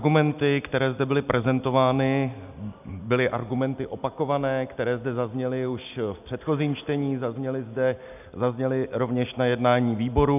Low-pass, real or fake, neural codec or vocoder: 3.6 kHz; real; none